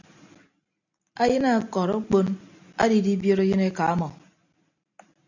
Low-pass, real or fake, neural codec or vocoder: 7.2 kHz; real; none